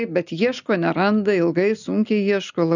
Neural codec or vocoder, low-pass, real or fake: none; 7.2 kHz; real